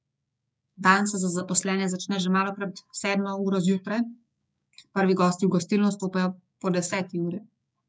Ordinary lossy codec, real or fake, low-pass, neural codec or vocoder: none; fake; none; codec, 16 kHz, 6 kbps, DAC